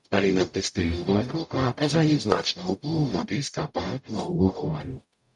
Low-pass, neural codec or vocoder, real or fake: 10.8 kHz; codec, 44.1 kHz, 0.9 kbps, DAC; fake